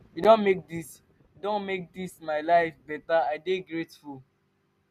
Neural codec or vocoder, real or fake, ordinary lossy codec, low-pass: none; real; none; 14.4 kHz